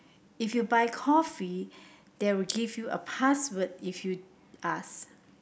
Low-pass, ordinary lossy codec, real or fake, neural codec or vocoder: none; none; real; none